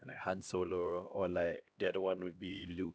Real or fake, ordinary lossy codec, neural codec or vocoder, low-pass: fake; none; codec, 16 kHz, 1 kbps, X-Codec, HuBERT features, trained on LibriSpeech; none